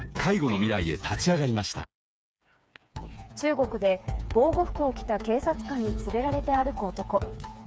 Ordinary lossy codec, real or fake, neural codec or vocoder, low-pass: none; fake; codec, 16 kHz, 4 kbps, FreqCodec, smaller model; none